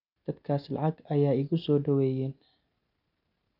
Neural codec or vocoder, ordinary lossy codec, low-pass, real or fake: none; none; 5.4 kHz; real